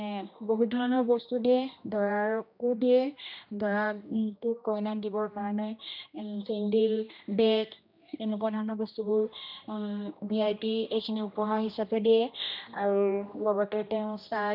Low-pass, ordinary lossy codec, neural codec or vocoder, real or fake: 5.4 kHz; none; codec, 16 kHz, 1 kbps, X-Codec, HuBERT features, trained on general audio; fake